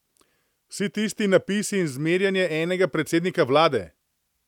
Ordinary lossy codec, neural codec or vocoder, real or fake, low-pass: none; none; real; 19.8 kHz